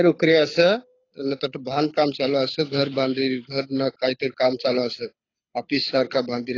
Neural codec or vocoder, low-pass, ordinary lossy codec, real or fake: codec, 24 kHz, 6 kbps, HILCodec; 7.2 kHz; AAC, 32 kbps; fake